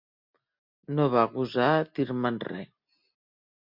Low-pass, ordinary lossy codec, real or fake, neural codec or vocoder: 5.4 kHz; MP3, 48 kbps; real; none